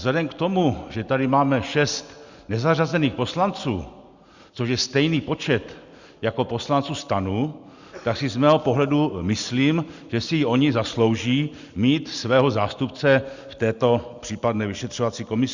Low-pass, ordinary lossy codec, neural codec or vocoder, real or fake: 7.2 kHz; Opus, 64 kbps; none; real